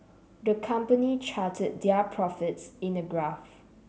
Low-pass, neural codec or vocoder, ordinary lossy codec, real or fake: none; none; none; real